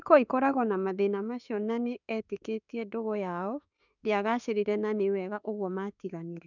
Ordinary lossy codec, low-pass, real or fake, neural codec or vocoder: none; 7.2 kHz; fake; autoencoder, 48 kHz, 32 numbers a frame, DAC-VAE, trained on Japanese speech